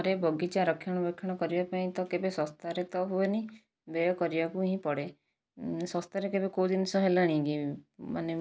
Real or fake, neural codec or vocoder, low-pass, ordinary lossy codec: real; none; none; none